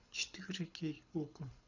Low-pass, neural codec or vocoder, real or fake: 7.2 kHz; codec, 24 kHz, 6 kbps, HILCodec; fake